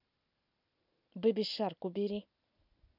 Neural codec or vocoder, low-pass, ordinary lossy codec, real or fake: none; 5.4 kHz; none; real